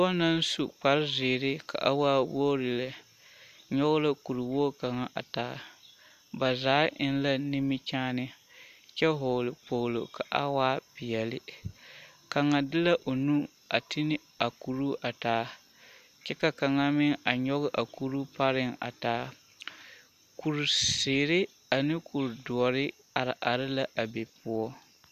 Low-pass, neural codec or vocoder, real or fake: 14.4 kHz; none; real